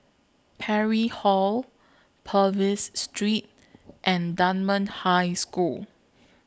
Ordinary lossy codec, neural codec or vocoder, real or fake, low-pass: none; none; real; none